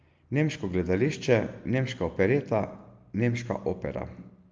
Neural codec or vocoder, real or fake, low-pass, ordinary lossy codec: none; real; 7.2 kHz; Opus, 24 kbps